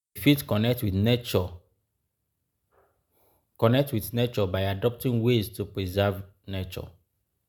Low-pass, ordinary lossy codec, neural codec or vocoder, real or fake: none; none; none; real